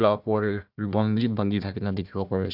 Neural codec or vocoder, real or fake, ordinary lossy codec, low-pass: codec, 16 kHz, 1 kbps, FunCodec, trained on Chinese and English, 50 frames a second; fake; none; 5.4 kHz